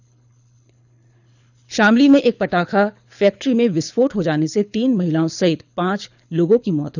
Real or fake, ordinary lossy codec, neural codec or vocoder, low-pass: fake; none; codec, 24 kHz, 6 kbps, HILCodec; 7.2 kHz